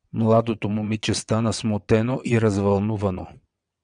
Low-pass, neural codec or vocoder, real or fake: 9.9 kHz; vocoder, 22.05 kHz, 80 mel bands, WaveNeXt; fake